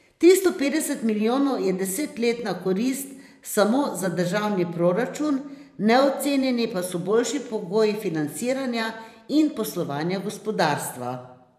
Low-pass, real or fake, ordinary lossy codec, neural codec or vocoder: 14.4 kHz; fake; none; vocoder, 44.1 kHz, 128 mel bands every 512 samples, BigVGAN v2